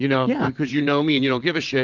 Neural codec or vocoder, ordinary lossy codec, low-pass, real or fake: vocoder, 22.05 kHz, 80 mel bands, WaveNeXt; Opus, 32 kbps; 7.2 kHz; fake